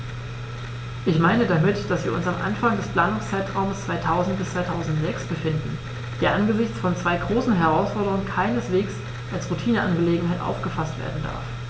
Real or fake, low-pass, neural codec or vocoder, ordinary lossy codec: real; none; none; none